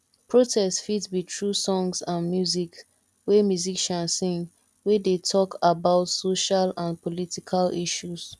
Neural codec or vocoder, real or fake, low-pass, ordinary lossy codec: none; real; none; none